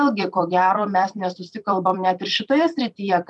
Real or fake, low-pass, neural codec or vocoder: fake; 10.8 kHz; vocoder, 44.1 kHz, 128 mel bands every 256 samples, BigVGAN v2